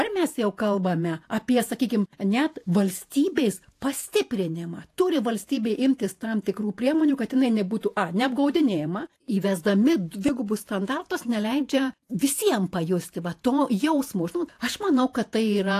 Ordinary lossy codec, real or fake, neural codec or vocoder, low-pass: AAC, 64 kbps; fake; vocoder, 48 kHz, 128 mel bands, Vocos; 14.4 kHz